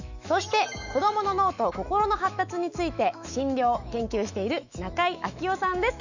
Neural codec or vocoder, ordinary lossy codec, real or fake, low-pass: autoencoder, 48 kHz, 128 numbers a frame, DAC-VAE, trained on Japanese speech; none; fake; 7.2 kHz